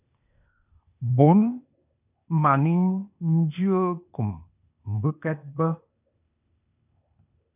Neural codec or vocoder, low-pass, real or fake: codec, 16 kHz, 0.8 kbps, ZipCodec; 3.6 kHz; fake